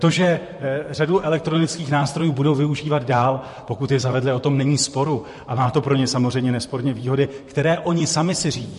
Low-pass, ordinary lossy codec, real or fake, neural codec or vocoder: 14.4 kHz; MP3, 48 kbps; fake; vocoder, 44.1 kHz, 128 mel bands, Pupu-Vocoder